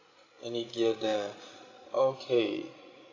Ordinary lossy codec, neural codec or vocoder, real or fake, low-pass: AAC, 32 kbps; codec, 16 kHz, 16 kbps, FreqCodec, larger model; fake; 7.2 kHz